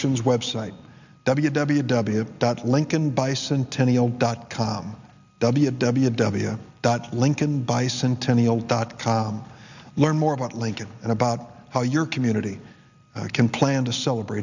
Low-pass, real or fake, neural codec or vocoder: 7.2 kHz; real; none